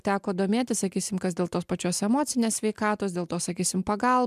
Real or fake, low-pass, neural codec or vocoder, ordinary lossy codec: real; 14.4 kHz; none; MP3, 96 kbps